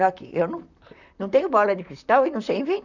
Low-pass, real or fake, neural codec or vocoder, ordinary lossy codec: 7.2 kHz; fake; vocoder, 44.1 kHz, 128 mel bands, Pupu-Vocoder; none